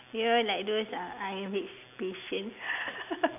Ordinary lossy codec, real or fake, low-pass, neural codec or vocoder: none; real; 3.6 kHz; none